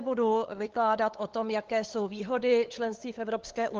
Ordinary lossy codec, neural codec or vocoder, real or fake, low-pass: Opus, 16 kbps; codec, 16 kHz, 8 kbps, FunCodec, trained on LibriTTS, 25 frames a second; fake; 7.2 kHz